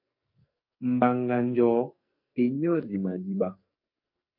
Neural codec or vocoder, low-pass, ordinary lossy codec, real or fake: codec, 44.1 kHz, 2.6 kbps, SNAC; 5.4 kHz; MP3, 32 kbps; fake